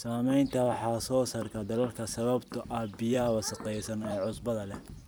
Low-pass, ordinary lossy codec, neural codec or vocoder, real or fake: none; none; vocoder, 44.1 kHz, 128 mel bands every 256 samples, BigVGAN v2; fake